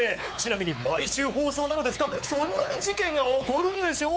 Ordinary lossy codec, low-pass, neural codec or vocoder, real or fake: none; none; codec, 16 kHz, 4 kbps, X-Codec, WavLM features, trained on Multilingual LibriSpeech; fake